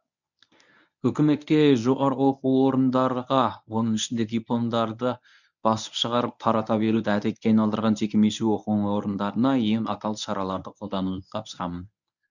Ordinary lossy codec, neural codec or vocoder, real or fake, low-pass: none; codec, 24 kHz, 0.9 kbps, WavTokenizer, medium speech release version 1; fake; 7.2 kHz